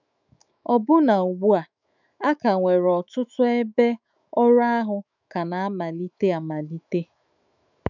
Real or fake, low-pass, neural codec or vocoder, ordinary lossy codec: fake; 7.2 kHz; autoencoder, 48 kHz, 128 numbers a frame, DAC-VAE, trained on Japanese speech; none